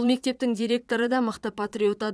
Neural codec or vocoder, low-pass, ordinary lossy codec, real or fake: vocoder, 22.05 kHz, 80 mel bands, Vocos; none; none; fake